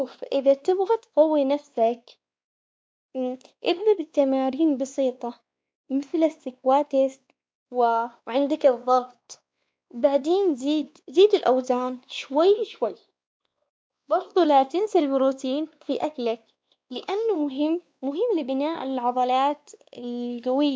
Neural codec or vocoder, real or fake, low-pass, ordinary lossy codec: codec, 16 kHz, 2 kbps, X-Codec, WavLM features, trained on Multilingual LibriSpeech; fake; none; none